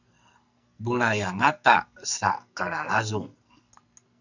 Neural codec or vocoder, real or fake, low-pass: codec, 44.1 kHz, 2.6 kbps, SNAC; fake; 7.2 kHz